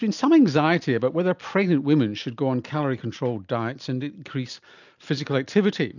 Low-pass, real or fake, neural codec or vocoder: 7.2 kHz; real; none